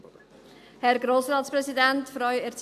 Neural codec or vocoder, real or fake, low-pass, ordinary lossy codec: none; real; 14.4 kHz; none